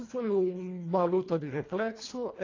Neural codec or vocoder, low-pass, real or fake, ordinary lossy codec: codec, 24 kHz, 1.5 kbps, HILCodec; 7.2 kHz; fake; AAC, 32 kbps